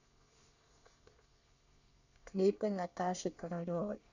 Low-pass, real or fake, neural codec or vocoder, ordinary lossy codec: 7.2 kHz; fake; codec, 24 kHz, 1 kbps, SNAC; none